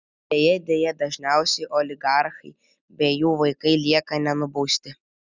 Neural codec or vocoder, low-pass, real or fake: none; 7.2 kHz; real